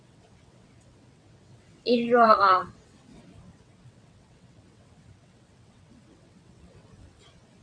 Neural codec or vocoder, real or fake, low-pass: vocoder, 22.05 kHz, 80 mel bands, WaveNeXt; fake; 9.9 kHz